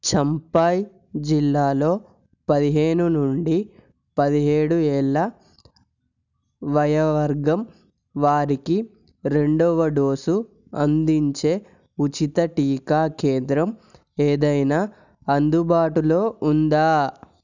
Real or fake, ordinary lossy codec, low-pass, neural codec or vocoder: real; none; 7.2 kHz; none